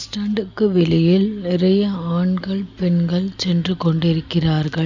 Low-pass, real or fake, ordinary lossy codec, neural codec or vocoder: 7.2 kHz; real; none; none